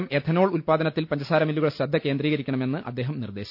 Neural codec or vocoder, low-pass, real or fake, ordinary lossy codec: none; 5.4 kHz; real; none